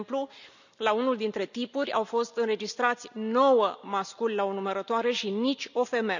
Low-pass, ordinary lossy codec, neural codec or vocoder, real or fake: 7.2 kHz; none; none; real